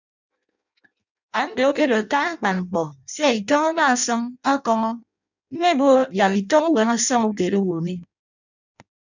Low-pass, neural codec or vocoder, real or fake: 7.2 kHz; codec, 16 kHz in and 24 kHz out, 0.6 kbps, FireRedTTS-2 codec; fake